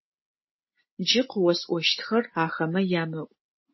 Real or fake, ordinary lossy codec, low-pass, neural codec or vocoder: real; MP3, 24 kbps; 7.2 kHz; none